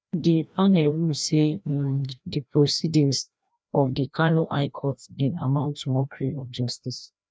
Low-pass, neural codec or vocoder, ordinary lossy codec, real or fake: none; codec, 16 kHz, 1 kbps, FreqCodec, larger model; none; fake